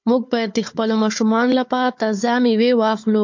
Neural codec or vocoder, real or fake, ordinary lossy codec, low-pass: codec, 16 kHz, 4 kbps, FunCodec, trained on Chinese and English, 50 frames a second; fake; MP3, 48 kbps; 7.2 kHz